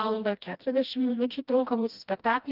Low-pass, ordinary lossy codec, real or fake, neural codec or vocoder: 5.4 kHz; Opus, 32 kbps; fake; codec, 16 kHz, 1 kbps, FreqCodec, smaller model